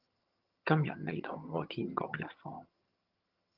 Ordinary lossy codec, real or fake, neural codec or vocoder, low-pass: Opus, 32 kbps; fake; vocoder, 22.05 kHz, 80 mel bands, HiFi-GAN; 5.4 kHz